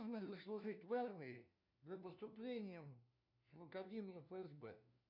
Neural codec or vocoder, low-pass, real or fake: codec, 16 kHz, 1 kbps, FunCodec, trained on LibriTTS, 50 frames a second; 5.4 kHz; fake